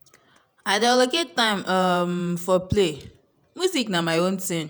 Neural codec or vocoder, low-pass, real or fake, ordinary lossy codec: vocoder, 48 kHz, 128 mel bands, Vocos; none; fake; none